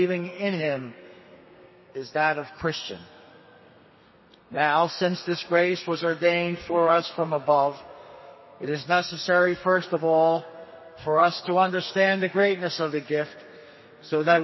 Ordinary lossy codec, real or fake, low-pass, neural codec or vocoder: MP3, 24 kbps; fake; 7.2 kHz; codec, 32 kHz, 1.9 kbps, SNAC